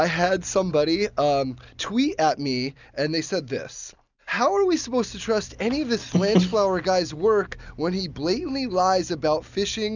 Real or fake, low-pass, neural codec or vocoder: real; 7.2 kHz; none